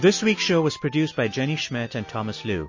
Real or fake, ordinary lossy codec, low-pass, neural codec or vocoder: real; MP3, 32 kbps; 7.2 kHz; none